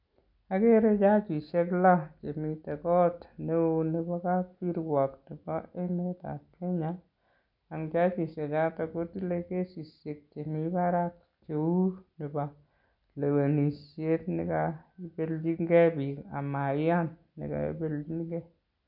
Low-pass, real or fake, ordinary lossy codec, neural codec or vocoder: 5.4 kHz; real; none; none